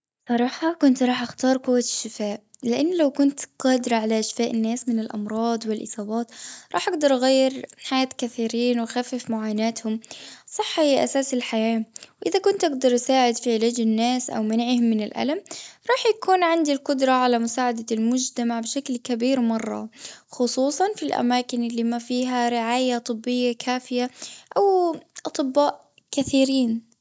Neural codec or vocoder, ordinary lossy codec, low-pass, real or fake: none; none; none; real